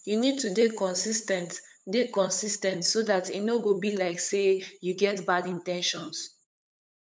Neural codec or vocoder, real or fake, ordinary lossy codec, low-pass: codec, 16 kHz, 8 kbps, FunCodec, trained on LibriTTS, 25 frames a second; fake; none; none